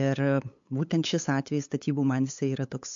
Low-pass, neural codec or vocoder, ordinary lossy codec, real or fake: 7.2 kHz; codec, 16 kHz, 8 kbps, FunCodec, trained on LibriTTS, 25 frames a second; MP3, 48 kbps; fake